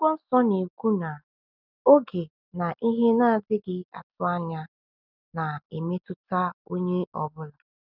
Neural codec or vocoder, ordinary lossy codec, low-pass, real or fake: none; none; 5.4 kHz; real